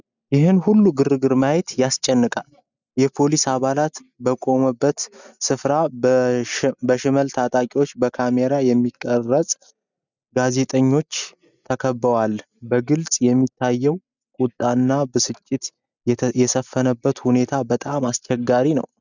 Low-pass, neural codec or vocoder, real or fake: 7.2 kHz; none; real